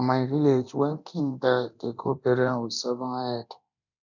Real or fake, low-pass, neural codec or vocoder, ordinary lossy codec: fake; 7.2 kHz; codec, 24 kHz, 0.9 kbps, DualCodec; none